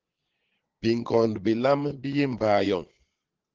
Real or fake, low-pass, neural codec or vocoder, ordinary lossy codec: fake; 7.2 kHz; vocoder, 22.05 kHz, 80 mel bands, Vocos; Opus, 16 kbps